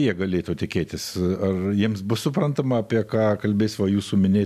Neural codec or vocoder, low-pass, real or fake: none; 14.4 kHz; real